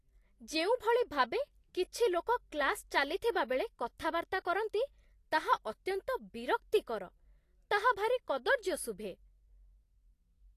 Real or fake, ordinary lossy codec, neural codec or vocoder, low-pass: real; AAC, 48 kbps; none; 14.4 kHz